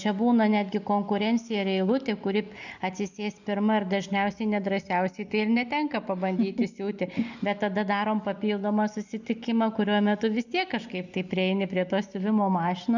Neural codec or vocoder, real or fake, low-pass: none; real; 7.2 kHz